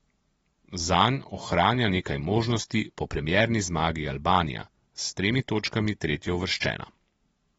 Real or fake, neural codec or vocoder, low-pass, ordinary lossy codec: real; none; 19.8 kHz; AAC, 24 kbps